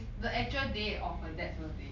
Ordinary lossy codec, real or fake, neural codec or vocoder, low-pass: none; real; none; 7.2 kHz